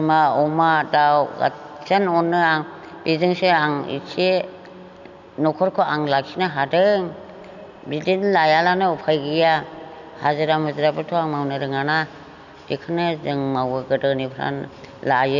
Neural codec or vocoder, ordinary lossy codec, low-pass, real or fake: none; none; 7.2 kHz; real